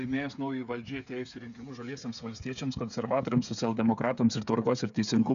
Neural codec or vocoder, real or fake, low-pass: codec, 16 kHz, 8 kbps, FreqCodec, smaller model; fake; 7.2 kHz